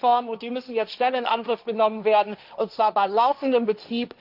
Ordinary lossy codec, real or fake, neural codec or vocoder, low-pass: none; fake; codec, 16 kHz, 1.1 kbps, Voila-Tokenizer; 5.4 kHz